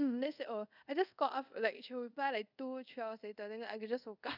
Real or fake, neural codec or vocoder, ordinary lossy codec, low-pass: fake; codec, 24 kHz, 0.5 kbps, DualCodec; none; 5.4 kHz